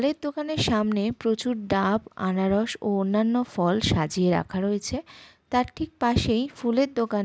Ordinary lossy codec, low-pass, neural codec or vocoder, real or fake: none; none; none; real